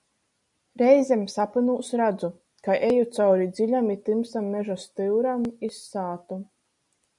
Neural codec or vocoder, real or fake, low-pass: none; real; 10.8 kHz